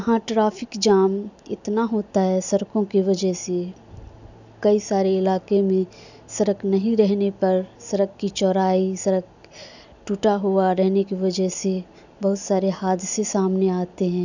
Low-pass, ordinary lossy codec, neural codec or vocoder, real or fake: 7.2 kHz; none; none; real